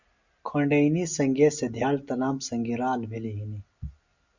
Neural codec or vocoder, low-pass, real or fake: none; 7.2 kHz; real